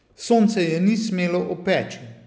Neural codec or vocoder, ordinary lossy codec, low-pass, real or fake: none; none; none; real